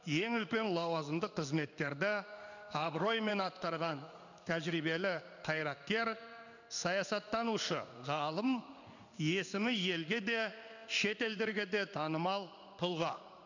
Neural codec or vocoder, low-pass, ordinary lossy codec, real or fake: codec, 16 kHz in and 24 kHz out, 1 kbps, XY-Tokenizer; 7.2 kHz; none; fake